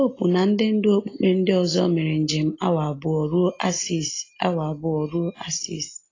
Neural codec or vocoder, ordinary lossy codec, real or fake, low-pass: none; AAC, 32 kbps; real; 7.2 kHz